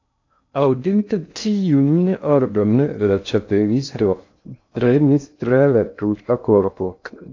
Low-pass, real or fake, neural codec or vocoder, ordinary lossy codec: 7.2 kHz; fake; codec, 16 kHz in and 24 kHz out, 0.6 kbps, FocalCodec, streaming, 2048 codes; AAC, 48 kbps